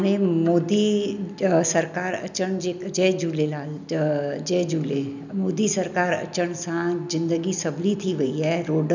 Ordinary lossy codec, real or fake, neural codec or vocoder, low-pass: none; real; none; 7.2 kHz